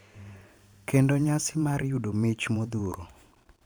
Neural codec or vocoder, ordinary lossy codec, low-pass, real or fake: vocoder, 44.1 kHz, 128 mel bands every 512 samples, BigVGAN v2; none; none; fake